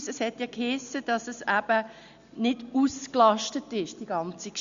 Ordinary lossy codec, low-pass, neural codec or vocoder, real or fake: Opus, 64 kbps; 7.2 kHz; none; real